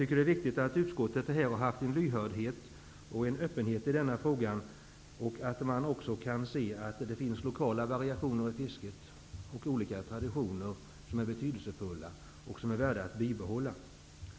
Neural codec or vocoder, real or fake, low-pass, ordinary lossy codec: none; real; none; none